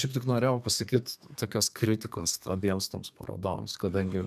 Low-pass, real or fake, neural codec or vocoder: 14.4 kHz; fake; codec, 32 kHz, 1.9 kbps, SNAC